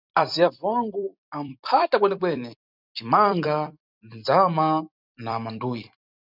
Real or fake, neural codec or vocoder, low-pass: fake; vocoder, 44.1 kHz, 128 mel bands every 256 samples, BigVGAN v2; 5.4 kHz